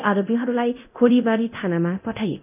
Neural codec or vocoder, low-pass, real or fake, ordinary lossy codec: codec, 24 kHz, 0.9 kbps, DualCodec; 3.6 kHz; fake; none